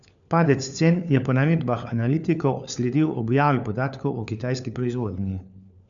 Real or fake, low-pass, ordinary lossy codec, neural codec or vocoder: fake; 7.2 kHz; none; codec, 16 kHz, 4 kbps, FunCodec, trained on LibriTTS, 50 frames a second